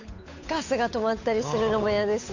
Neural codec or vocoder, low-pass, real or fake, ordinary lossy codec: codec, 16 kHz, 8 kbps, FunCodec, trained on Chinese and English, 25 frames a second; 7.2 kHz; fake; AAC, 48 kbps